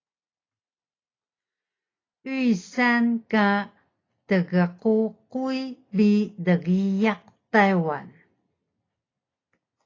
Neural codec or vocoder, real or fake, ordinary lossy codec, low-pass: none; real; AAC, 32 kbps; 7.2 kHz